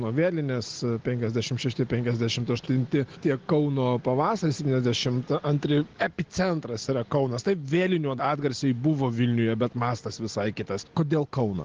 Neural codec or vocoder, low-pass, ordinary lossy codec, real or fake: none; 7.2 kHz; Opus, 16 kbps; real